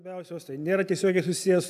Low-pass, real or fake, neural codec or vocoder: 14.4 kHz; real; none